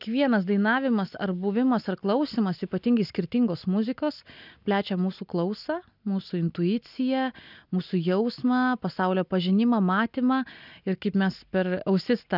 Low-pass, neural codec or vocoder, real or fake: 5.4 kHz; none; real